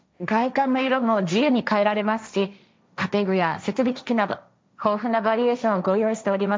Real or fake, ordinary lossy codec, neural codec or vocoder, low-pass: fake; none; codec, 16 kHz, 1.1 kbps, Voila-Tokenizer; none